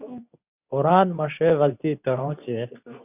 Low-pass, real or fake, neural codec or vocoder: 3.6 kHz; fake; codec, 24 kHz, 0.9 kbps, WavTokenizer, medium speech release version 2